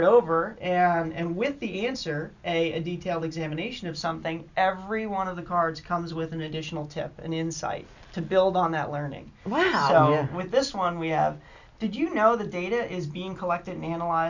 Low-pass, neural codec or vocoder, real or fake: 7.2 kHz; none; real